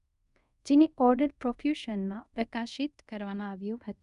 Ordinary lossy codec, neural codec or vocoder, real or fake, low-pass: none; codec, 24 kHz, 0.5 kbps, DualCodec; fake; 10.8 kHz